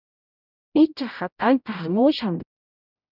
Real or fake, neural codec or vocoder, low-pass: fake; codec, 16 kHz, 0.5 kbps, X-Codec, HuBERT features, trained on general audio; 5.4 kHz